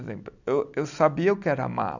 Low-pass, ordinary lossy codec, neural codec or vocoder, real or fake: 7.2 kHz; none; none; real